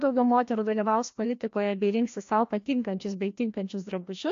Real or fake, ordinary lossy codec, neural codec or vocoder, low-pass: fake; AAC, 48 kbps; codec, 16 kHz, 1 kbps, FreqCodec, larger model; 7.2 kHz